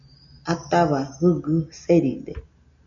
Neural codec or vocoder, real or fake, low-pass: none; real; 7.2 kHz